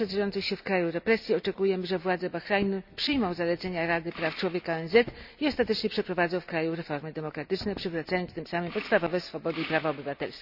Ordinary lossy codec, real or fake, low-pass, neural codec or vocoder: none; real; 5.4 kHz; none